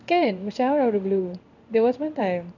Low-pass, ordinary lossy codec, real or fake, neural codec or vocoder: 7.2 kHz; none; fake; codec, 16 kHz in and 24 kHz out, 1 kbps, XY-Tokenizer